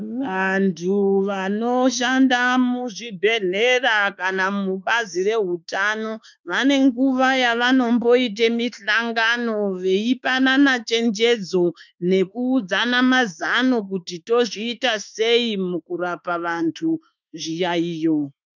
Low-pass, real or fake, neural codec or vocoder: 7.2 kHz; fake; autoencoder, 48 kHz, 32 numbers a frame, DAC-VAE, trained on Japanese speech